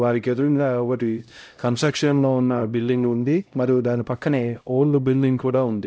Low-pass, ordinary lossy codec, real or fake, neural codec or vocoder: none; none; fake; codec, 16 kHz, 0.5 kbps, X-Codec, HuBERT features, trained on LibriSpeech